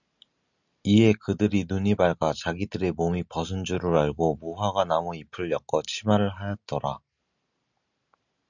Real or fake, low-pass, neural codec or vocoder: real; 7.2 kHz; none